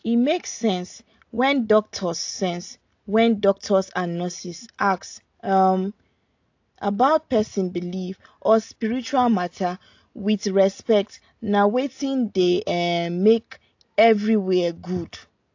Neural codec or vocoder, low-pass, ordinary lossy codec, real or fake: none; 7.2 kHz; AAC, 48 kbps; real